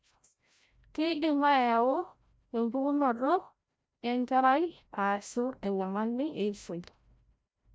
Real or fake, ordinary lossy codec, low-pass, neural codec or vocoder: fake; none; none; codec, 16 kHz, 0.5 kbps, FreqCodec, larger model